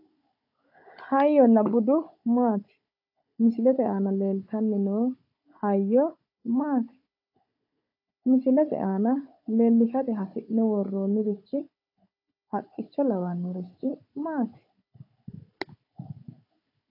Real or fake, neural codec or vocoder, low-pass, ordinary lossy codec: fake; codec, 16 kHz, 16 kbps, FunCodec, trained on Chinese and English, 50 frames a second; 5.4 kHz; AAC, 48 kbps